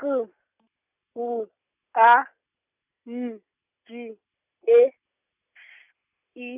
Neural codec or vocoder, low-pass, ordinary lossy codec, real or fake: none; 3.6 kHz; none; real